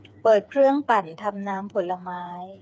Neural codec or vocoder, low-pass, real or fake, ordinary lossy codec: codec, 16 kHz, 4 kbps, FreqCodec, smaller model; none; fake; none